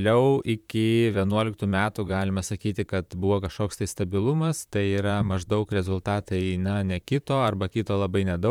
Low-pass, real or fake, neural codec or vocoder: 19.8 kHz; fake; vocoder, 44.1 kHz, 128 mel bands, Pupu-Vocoder